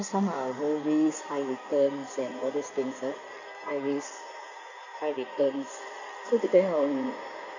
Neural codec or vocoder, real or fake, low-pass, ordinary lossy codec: codec, 16 kHz in and 24 kHz out, 2.2 kbps, FireRedTTS-2 codec; fake; 7.2 kHz; none